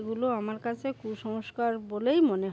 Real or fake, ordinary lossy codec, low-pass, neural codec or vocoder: real; none; none; none